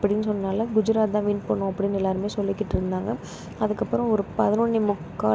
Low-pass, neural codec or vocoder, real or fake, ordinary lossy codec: none; none; real; none